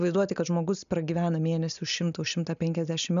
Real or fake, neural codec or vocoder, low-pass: real; none; 7.2 kHz